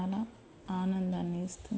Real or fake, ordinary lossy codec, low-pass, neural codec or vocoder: real; none; none; none